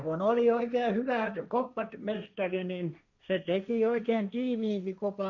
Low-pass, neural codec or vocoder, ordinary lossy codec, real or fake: 7.2 kHz; codec, 16 kHz, 1.1 kbps, Voila-Tokenizer; none; fake